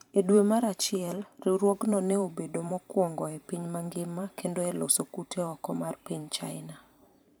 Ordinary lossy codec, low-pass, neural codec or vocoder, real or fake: none; none; vocoder, 44.1 kHz, 128 mel bands every 512 samples, BigVGAN v2; fake